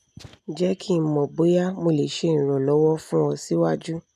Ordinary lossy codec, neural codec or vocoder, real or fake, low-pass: none; none; real; 14.4 kHz